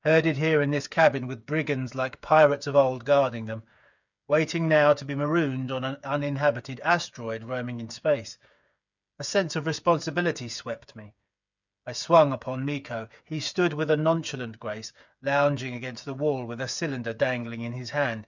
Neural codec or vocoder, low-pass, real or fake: codec, 16 kHz, 8 kbps, FreqCodec, smaller model; 7.2 kHz; fake